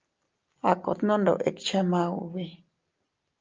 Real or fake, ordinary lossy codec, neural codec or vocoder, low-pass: real; Opus, 24 kbps; none; 7.2 kHz